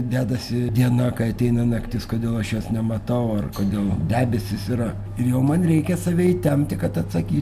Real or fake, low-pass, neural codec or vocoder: real; 14.4 kHz; none